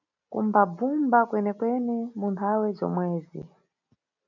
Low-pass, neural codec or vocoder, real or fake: 7.2 kHz; none; real